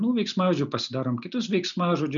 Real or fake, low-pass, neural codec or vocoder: real; 7.2 kHz; none